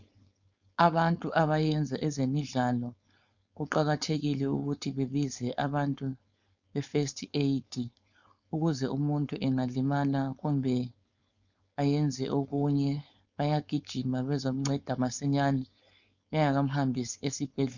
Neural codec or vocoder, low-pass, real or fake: codec, 16 kHz, 4.8 kbps, FACodec; 7.2 kHz; fake